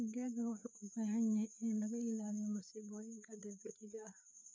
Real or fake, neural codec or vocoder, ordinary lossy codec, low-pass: fake; codec, 16 kHz, 4 kbps, FreqCodec, larger model; none; none